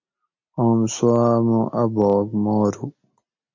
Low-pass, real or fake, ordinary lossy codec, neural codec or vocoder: 7.2 kHz; real; MP3, 48 kbps; none